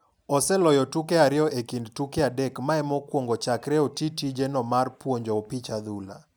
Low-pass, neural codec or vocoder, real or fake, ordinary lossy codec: none; none; real; none